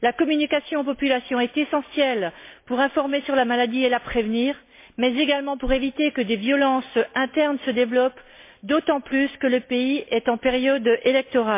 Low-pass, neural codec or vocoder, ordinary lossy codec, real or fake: 3.6 kHz; none; MP3, 24 kbps; real